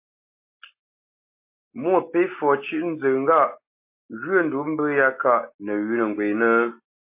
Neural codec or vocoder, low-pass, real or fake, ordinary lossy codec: none; 3.6 kHz; real; MP3, 24 kbps